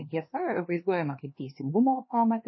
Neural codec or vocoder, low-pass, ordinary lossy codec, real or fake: codec, 16 kHz, 2 kbps, X-Codec, HuBERT features, trained on LibriSpeech; 7.2 kHz; MP3, 24 kbps; fake